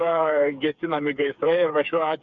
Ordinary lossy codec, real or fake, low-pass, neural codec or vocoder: MP3, 96 kbps; fake; 7.2 kHz; codec, 16 kHz, 4 kbps, FreqCodec, smaller model